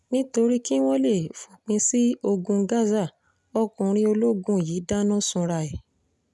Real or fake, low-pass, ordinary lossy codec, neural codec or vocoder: real; none; none; none